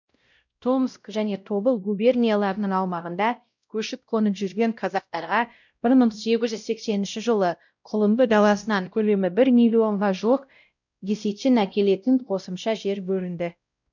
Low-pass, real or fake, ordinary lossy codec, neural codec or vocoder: 7.2 kHz; fake; none; codec, 16 kHz, 0.5 kbps, X-Codec, WavLM features, trained on Multilingual LibriSpeech